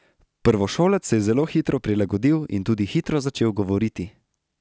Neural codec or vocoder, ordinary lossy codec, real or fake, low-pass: none; none; real; none